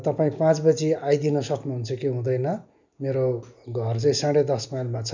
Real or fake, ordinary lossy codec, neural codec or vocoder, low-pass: real; none; none; 7.2 kHz